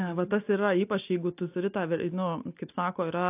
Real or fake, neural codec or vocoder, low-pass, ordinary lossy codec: real; none; 3.6 kHz; AAC, 32 kbps